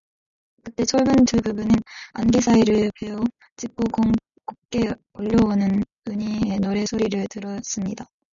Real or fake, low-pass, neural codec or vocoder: real; 7.2 kHz; none